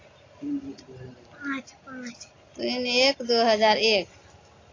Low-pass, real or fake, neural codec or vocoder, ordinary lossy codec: 7.2 kHz; real; none; AAC, 32 kbps